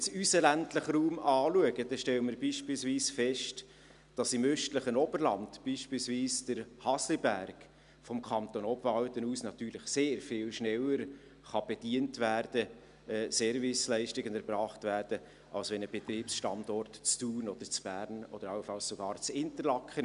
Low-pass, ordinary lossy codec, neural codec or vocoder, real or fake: 10.8 kHz; none; none; real